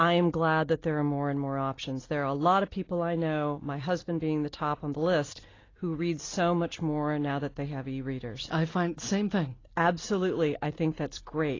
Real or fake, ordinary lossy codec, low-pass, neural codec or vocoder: real; AAC, 32 kbps; 7.2 kHz; none